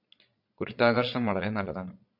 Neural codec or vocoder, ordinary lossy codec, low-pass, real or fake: vocoder, 22.05 kHz, 80 mel bands, Vocos; MP3, 48 kbps; 5.4 kHz; fake